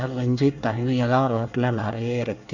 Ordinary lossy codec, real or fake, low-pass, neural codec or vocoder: none; fake; 7.2 kHz; codec, 24 kHz, 1 kbps, SNAC